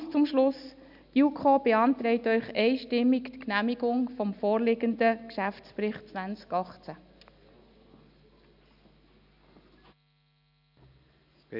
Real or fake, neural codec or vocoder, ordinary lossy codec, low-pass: real; none; none; 5.4 kHz